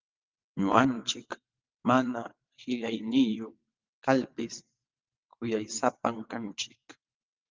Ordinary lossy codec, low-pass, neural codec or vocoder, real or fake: Opus, 32 kbps; 7.2 kHz; vocoder, 22.05 kHz, 80 mel bands, WaveNeXt; fake